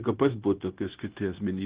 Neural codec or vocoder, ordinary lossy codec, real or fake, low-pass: codec, 16 kHz, 0.9 kbps, LongCat-Audio-Codec; Opus, 16 kbps; fake; 3.6 kHz